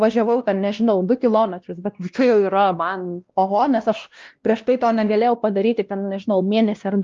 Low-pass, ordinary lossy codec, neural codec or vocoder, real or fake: 7.2 kHz; Opus, 32 kbps; codec, 16 kHz, 1 kbps, X-Codec, WavLM features, trained on Multilingual LibriSpeech; fake